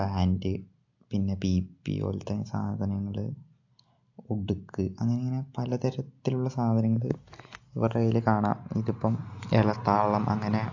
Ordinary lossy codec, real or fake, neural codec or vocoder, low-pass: AAC, 48 kbps; real; none; 7.2 kHz